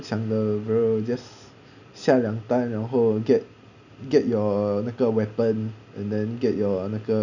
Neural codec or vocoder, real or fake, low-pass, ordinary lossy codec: none; real; 7.2 kHz; none